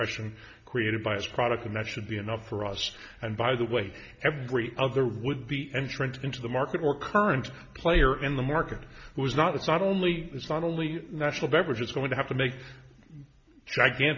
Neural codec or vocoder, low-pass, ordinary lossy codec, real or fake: none; 7.2 kHz; MP3, 64 kbps; real